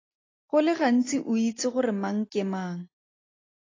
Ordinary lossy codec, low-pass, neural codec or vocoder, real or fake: AAC, 32 kbps; 7.2 kHz; none; real